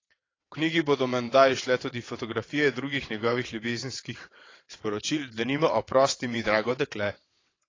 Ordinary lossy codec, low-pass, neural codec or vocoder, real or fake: AAC, 32 kbps; 7.2 kHz; vocoder, 22.05 kHz, 80 mel bands, WaveNeXt; fake